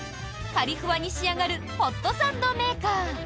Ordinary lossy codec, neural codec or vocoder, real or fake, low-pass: none; none; real; none